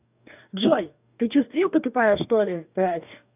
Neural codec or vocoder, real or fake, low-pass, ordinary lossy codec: codec, 44.1 kHz, 2.6 kbps, DAC; fake; 3.6 kHz; none